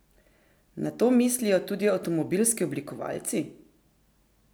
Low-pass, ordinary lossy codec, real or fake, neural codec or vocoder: none; none; real; none